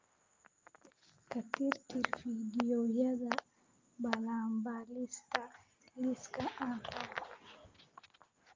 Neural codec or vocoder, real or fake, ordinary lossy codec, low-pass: none; real; Opus, 24 kbps; 7.2 kHz